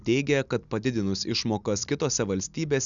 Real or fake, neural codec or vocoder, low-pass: real; none; 7.2 kHz